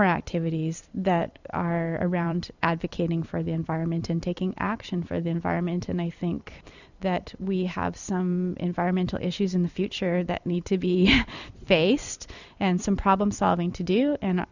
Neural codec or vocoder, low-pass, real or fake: vocoder, 44.1 kHz, 128 mel bands every 256 samples, BigVGAN v2; 7.2 kHz; fake